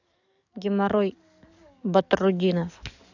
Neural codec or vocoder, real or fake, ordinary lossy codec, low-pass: codec, 44.1 kHz, 7.8 kbps, DAC; fake; none; 7.2 kHz